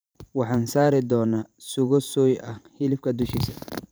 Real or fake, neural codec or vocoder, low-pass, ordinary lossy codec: fake; vocoder, 44.1 kHz, 128 mel bands every 512 samples, BigVGAN v2; none; none